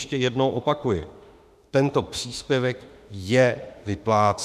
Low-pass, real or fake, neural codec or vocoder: 14.4 kHz; fake; autoencoder, 48 kHz, 32 numbers a frame, DAC-VAE, trained on Japanese speech